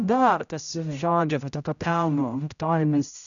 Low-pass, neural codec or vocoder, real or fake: 7.2 kHz; codec, 16 kHz, 0.5 kbps, X-Codec, HuBERT features, trained on general audio; fake